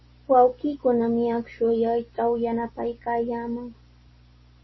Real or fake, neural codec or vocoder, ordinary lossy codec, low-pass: real; none; MP3, 24 kbps; 7.2 kHz